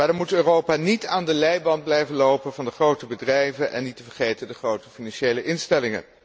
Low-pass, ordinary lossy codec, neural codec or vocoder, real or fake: none; none; none; real